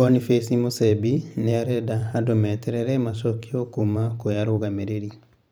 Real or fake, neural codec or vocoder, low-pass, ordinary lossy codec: fake; vocoder, 44.1 kHz, 128 mel bands every 512 samples, BigVGAN v2; none; none